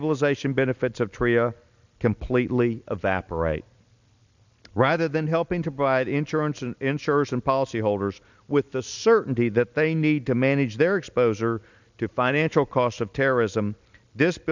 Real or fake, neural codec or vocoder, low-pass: real; none; 7.2 kHz